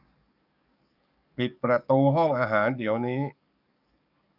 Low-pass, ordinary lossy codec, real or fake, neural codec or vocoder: 5.4 kHz; none; fake; codec, 44.1 kHz, 7.8 kbps, DAC